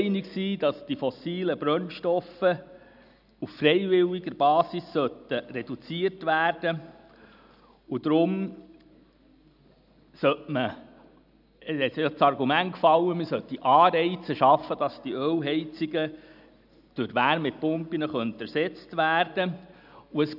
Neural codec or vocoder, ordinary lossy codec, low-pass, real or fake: none; none; 5.4 kHz; real